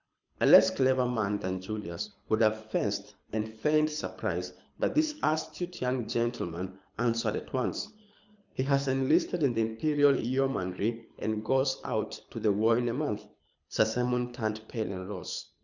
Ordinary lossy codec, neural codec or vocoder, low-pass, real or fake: Opus, 64 kbps; codec, 24 kHz, 6 kbps, HILCodec; 7.2 kHz; fake